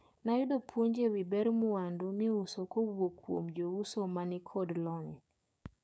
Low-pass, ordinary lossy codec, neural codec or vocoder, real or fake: none; none; codec, 16 kHz, 6 kbps, DAC; fake